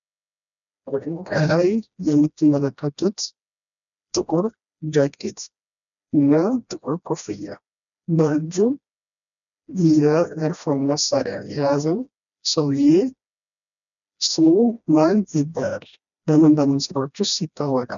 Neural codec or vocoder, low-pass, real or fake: codec, 16 kHz, 1 kbps, FreqCodec, smaller model; 7.2 kHz; fake